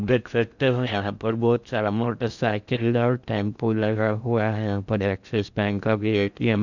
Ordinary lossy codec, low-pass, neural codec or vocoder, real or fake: none; 7.2 kHz; codec, 16 kHz in and 24 kHz out, 0.6 kbps, FocalCodec, streaming, 4096 codes; fake